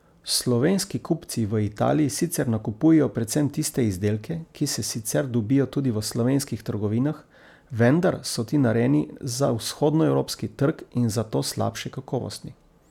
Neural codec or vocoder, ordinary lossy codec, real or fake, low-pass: none; none; real; 19.8 kHz